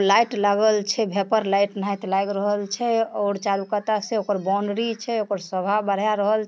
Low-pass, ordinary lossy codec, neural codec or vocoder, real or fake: none; none; none; real